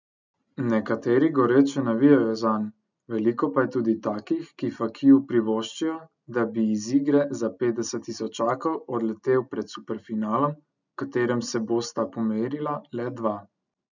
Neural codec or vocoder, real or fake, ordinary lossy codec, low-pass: none; real; none; 7.2 kHz